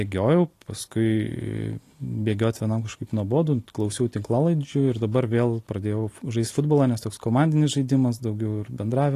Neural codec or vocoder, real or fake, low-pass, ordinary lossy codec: none; real; 14.4 kHz; AAC, 48 kbps